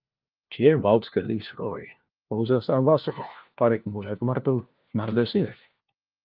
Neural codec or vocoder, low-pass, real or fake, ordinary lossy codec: codec, 16 kHz, 1 kbps, FunCodec, trained on LibriTTS, 50 frames a second; 5.4 kHz; fake; Opus, 24 kbps